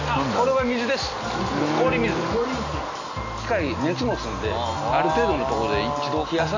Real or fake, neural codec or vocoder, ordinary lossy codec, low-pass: real; none; AAC, 48 kbps; 7.2 kHz